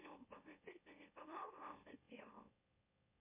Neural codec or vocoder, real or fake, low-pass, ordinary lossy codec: autoencoder, 44.1 kHz, a latent of 192 numbers a frame, MeloTTS; fake; 3.6 kHz; MP3, 32 kbps